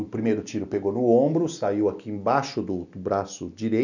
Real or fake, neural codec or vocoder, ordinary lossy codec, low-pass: real; none; none; 7.2 kHz